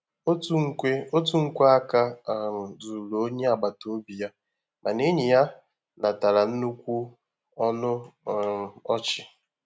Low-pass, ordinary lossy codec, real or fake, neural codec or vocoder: none; none; real; none